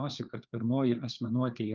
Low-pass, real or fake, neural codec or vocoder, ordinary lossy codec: 7.2 kHz; fake; codec, 16 kHz, 4 kbps, FreqCodec, larger model; Opus, 32 kbps